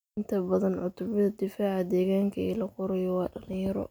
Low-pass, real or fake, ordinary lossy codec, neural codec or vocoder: none; real; none; none